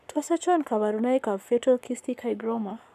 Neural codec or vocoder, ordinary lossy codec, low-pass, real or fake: codec, 44.1 kHz, 7.8 kbps, Pupu-Codec; none; 14.4 kHz; fake